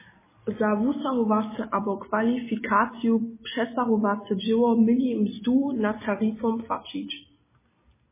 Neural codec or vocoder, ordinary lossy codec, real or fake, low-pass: none; MP3, 16 kbps; real; 3.6 kHz